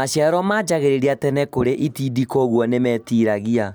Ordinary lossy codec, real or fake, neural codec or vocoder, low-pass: none; real; none; none